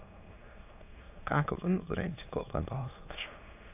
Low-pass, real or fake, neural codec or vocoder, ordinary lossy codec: 3.6 kHz; fake; autoencoder, 22.05 kHz, a latent of 192 numbers a frame, VITS, trained on many speakers; AAC, 32 kbps